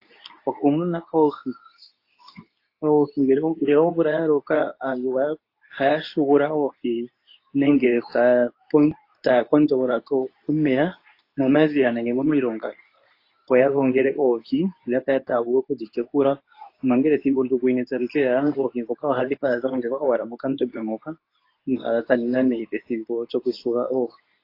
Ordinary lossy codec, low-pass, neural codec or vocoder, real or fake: AAC, 32 kbps; 5.4 kHz; codec, 24 kHz, 0.9 kbps, WavTokenizer, medium speech release version 2; fake